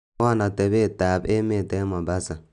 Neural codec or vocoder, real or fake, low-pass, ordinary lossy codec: none; real; 10.8 kHz; none